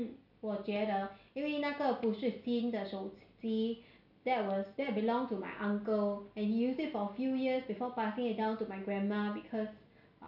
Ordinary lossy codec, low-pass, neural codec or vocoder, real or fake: none; 5.4 kHz; none; real